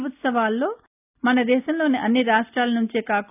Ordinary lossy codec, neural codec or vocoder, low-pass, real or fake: none; none; 3.6 kHz; real